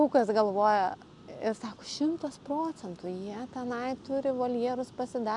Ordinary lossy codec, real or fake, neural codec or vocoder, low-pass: MP3, 96 kbps; real; none; 10.8 kHz